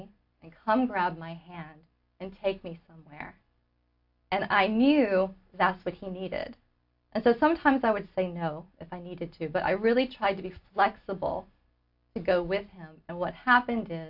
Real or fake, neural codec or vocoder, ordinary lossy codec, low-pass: real; none; AAC, 48 kbps; 5.4 kHz